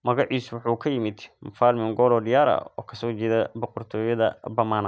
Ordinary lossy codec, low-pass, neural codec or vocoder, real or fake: none; none; none; real